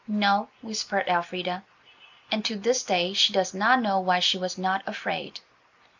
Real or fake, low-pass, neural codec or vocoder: real; 7.2 kHz; none